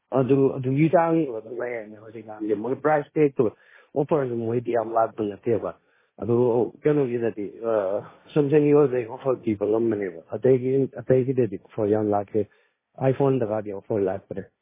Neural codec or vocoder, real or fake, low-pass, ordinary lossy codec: codec, 16 kHz, 1.1 kbps, Voila-Tokenizer; fake; 3.6 kHz; MP3, 16 kbps